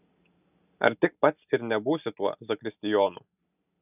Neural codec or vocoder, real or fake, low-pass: none; real; 3.6 kHz